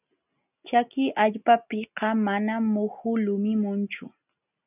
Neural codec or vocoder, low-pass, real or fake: none; 3.6 kHz; real